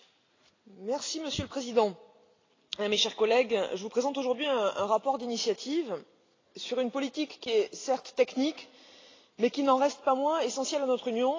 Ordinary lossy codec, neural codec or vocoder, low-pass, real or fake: AAC, 32 kbps; none; 7.2 kHz; real